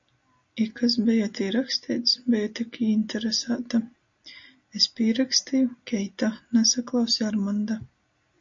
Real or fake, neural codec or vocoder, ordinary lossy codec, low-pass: real; none; MP3, 48 kbps; 7.2 kHz